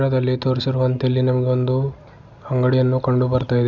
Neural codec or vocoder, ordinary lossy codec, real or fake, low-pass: none; none; real; 7.2 kHz